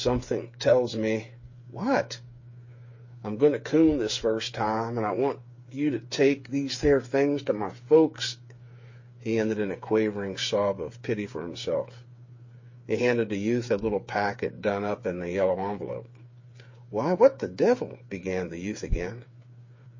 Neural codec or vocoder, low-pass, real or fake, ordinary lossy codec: codec, 16 kHz, 8 kbps, FreqCodec, smaller model; 7.2 kHz; fake; MP3, 32 kbps